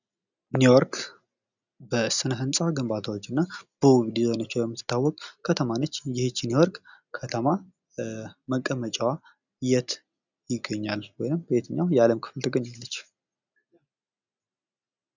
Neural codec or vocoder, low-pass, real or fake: none; 7.2 kHz; real